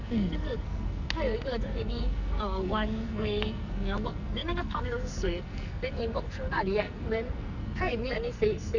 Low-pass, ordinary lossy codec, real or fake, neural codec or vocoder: 7.2 kHz; none; fake; codec, 44.1 kHz, 2.6 kbps, SNAC